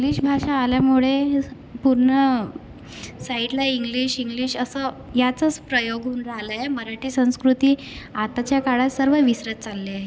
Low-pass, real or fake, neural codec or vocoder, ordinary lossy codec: none; real; none; none